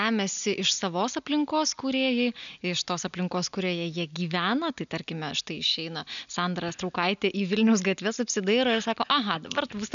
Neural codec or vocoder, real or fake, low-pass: none; real; 7.2 kHz